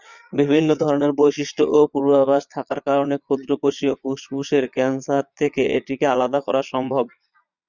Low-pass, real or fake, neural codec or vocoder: 7.2 kHz; fake; vocoder, 22.05 kHz, 80 mel bands, Vocos